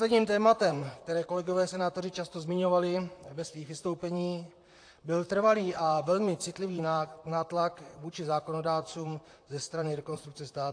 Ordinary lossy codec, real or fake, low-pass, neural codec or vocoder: AAC, 48 kbps; fake; 9.9 kHz; vocoder, 44.1 kHz, 128 mel bands, Pupu-Vocoder